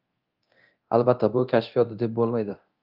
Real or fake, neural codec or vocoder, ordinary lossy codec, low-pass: fake; codec, 24 kHz, 0.9 kbps, DualCodec; Opus, 32 kbps; 5.4 kHz